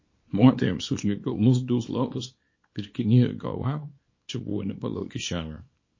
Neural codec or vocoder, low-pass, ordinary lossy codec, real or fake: codec, 24 kHz, 0.9 kbps, WavTokenizer, small release; 7.2 kHz; MP3, 32 kbps; fake